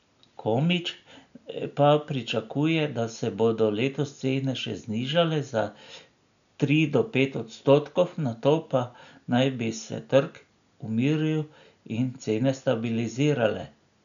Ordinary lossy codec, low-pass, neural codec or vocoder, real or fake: none; 7.2 kHz; none; real